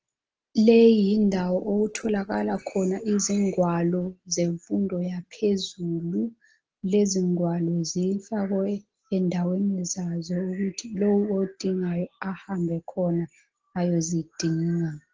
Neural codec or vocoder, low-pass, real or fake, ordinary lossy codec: none; 7.2 kHz; real; Opus, 16 kbps